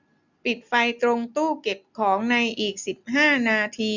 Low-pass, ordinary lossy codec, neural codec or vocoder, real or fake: 7.2 kHz; none; none; real